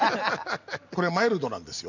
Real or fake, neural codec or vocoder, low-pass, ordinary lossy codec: real; none; 7.2 kHz; none